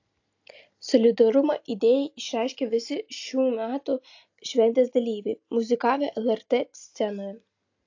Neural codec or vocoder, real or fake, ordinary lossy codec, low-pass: none; real; AAC, 48 kbps; 7.2 kHz